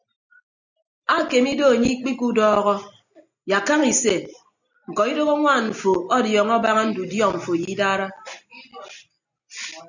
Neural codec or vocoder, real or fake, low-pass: none; real; 7.2 kHz